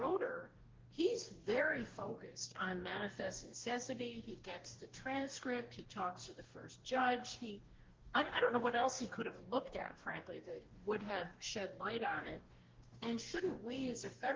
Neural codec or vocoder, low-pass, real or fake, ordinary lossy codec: codec, 44.1 kHz, 2.6 kbps, DAC; 7.2 kHz; fake; Opus, 16 kbps